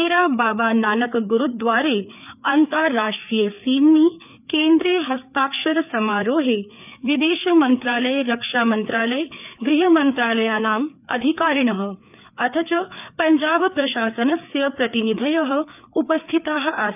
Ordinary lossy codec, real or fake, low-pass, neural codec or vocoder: none; fake; 3.6 kHz; codec, 16 kHz, 4 kbps, FreqCodec, larger model